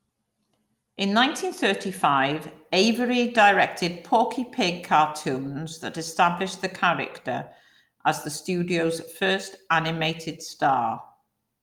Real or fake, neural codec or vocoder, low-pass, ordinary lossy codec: real; none; 19.8 kHz; Opus, 32 kbps